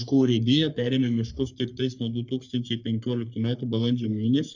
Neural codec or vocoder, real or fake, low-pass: codec, 44.1 kHz, 3.4 kbps, Pupu-Codec; fake; 7.2 kHz